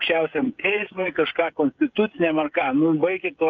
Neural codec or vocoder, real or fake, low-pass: codec, 16 kHz, 16 kbps, FreqCodec, smaller model; fake; 7.2 kHz